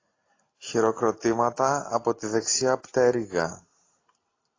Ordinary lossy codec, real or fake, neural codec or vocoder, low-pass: AAC, 32 kbps; real; none; 7.2 kHz